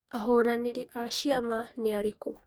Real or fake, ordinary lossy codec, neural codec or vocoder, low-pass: fake; none; codec, 44.1 kHz, 2.6 kbps, DAC; none